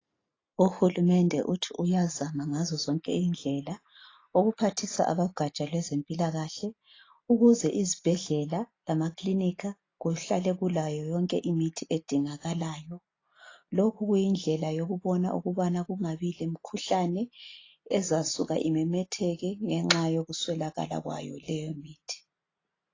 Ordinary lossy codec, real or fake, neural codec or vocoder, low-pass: AAC, 32 kbps; real; none; 7.2 kHz